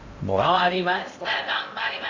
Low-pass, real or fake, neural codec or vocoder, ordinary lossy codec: 7.2 kHz; fake; codec, 16 kHz in and 24 kHz out, 0.6 kbps, FocalCodec, streaming, 4096 codes; AAC, 48 kbps